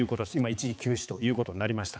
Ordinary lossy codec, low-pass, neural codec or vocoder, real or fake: none; none; codec, 16 kHz, 4 kbps, X-Codec, HuBERT features, trained on balanced general audio; fake